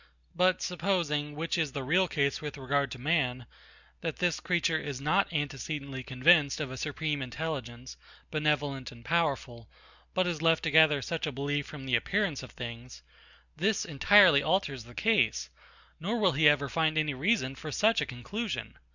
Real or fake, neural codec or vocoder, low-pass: real; none; 7.2 kHz